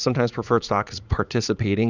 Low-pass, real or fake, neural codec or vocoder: 7.2 kHz; fake; vocoder, 44.1 kHz, 80 mel bands, Vocos